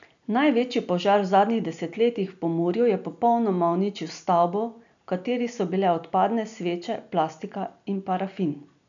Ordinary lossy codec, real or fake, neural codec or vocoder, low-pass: none; real; none; 7.2 kHz